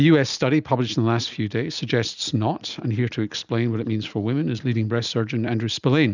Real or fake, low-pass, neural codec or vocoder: real; 7.2 kHz; none